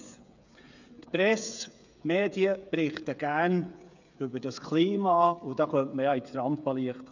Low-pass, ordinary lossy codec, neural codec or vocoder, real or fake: 7.2 kHz; none; codec, 16 kHz, 8 kbps, FreqCodec, smaller model; fake